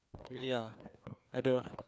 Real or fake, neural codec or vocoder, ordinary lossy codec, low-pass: fake; codec, 16 kHz, 2 kbps, FreqCodec, larger model; none; none